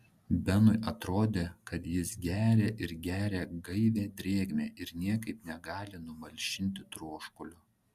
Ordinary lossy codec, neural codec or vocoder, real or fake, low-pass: Opus, 64 kbps; none; real; 14.4 kHz